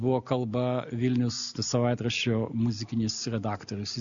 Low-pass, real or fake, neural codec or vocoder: 7.2 kHz; real; none